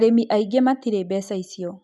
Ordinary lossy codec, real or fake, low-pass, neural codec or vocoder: none; real; none; none